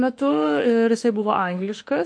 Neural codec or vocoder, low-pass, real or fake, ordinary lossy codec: autoencoder, 48 kHz, 32 numbers a frame, DAC-VAE, trained on Japanese speech; 9.9 kHz; fake; MP3, 48 kbps